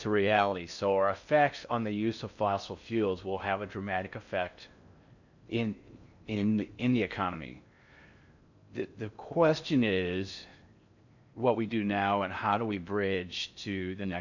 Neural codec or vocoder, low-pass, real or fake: codec, 16 kHz in and 24 kHz out, 0.6 kbps, FocalCodec, streaming, 4096 codes; 7.2 kHz; fake